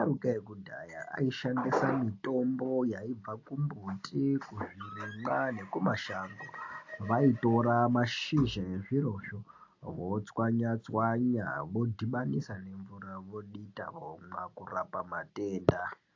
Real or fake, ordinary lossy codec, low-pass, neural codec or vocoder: real; AAC, 48 kbps; 7.2 kHz; none